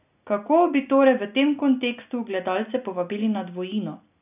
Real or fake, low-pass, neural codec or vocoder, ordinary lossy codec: real; 3.6 kHz; none; none